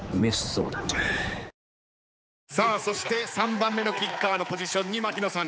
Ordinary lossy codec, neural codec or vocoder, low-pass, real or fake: none; codec, 16 kHz, 4 kbps, X-Codec, HuBERT features, trained on general audio; none; fake